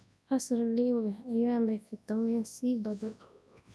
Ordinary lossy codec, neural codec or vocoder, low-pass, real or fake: none; codec, 24 kHz, 0.9 kbps, WavTokenizer, large speech release; none; fake